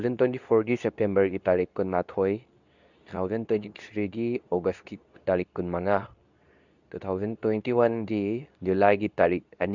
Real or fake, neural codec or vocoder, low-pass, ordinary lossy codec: fake; codec, 24 kHz, 0.9 kbps, WavTokenizer, medium speech release version 2; 7.2 kHz; none